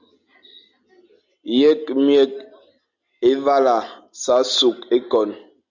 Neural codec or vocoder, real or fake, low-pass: none; real; 7.2 kHz